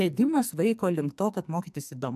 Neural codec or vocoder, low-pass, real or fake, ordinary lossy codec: codec, 44.1 kHz, 2.6 kbps, SNAC; 14.4 kHz; fake; MP3, 96 kbps